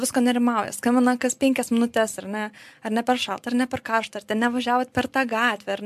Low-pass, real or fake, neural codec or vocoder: 14.4 kHz; real; none